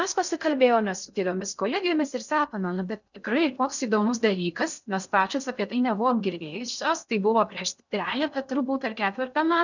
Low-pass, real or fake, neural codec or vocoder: 7.2 kHz; fake; codec, 16 kHz in and 24 kHz out, 0.6 kbps, FocalCodec, streaming, 2048 codes